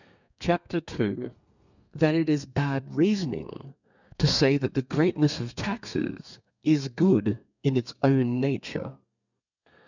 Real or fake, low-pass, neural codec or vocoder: fake; 7.2 kHz; codec, 32 kHz, 1.9 kbps, SNAC